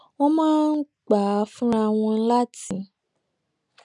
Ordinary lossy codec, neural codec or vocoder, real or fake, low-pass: none; none; real; 10.8 kHz